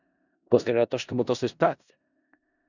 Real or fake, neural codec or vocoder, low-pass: fake; codec, 16 kHz in and 24 kHz out, 0.4 kbps, LongCat-Audio-Codec, four codebook decoder; 7.2 kHz